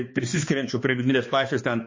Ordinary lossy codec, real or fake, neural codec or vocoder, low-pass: MP3, 32 kbps; fake; codec, 16 kHz, 2 kbps, X-Codec, HuBERT features, trained on balanced general audio; 7.2 kHz